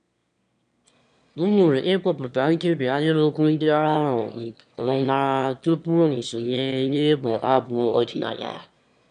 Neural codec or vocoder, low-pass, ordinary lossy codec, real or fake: autoencoder, 22.05 kHz, a latent of 192 numbers a frame, VITS, trained on one speaker; 9.9 kHz; none; fake